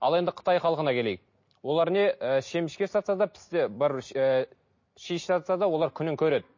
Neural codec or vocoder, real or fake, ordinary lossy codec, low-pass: none; real; MP3, 32 kbps; 7.2 kHz